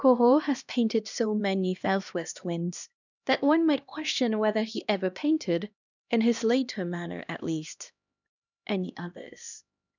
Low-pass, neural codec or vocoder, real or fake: 7.2 kHz; codec, 16 kHz, 1 kbps, X-Codec, HuBERT features, trained on LibriSpeech; fake